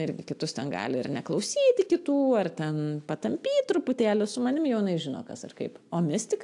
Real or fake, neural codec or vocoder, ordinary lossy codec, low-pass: real; none; AAC, 64 kbps; 10.8 kHz